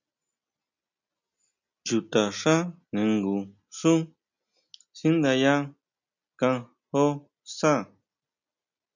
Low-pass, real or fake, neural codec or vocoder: 7.2 kHz; real; none